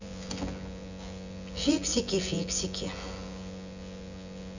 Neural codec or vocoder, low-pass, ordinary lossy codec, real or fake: vocoder, 24 kHz, 100 mel bands, Vocos; 7.2 kHz; none; fake